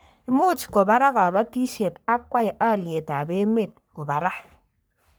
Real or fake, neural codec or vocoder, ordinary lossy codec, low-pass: fake; codec, 44.1 kHz, 3.4 kbps, Pupu-Codec; none; none